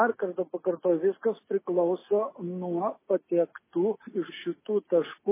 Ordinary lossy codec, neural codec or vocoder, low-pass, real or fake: MP3, 16 kbps; none; 3.6 kHz; real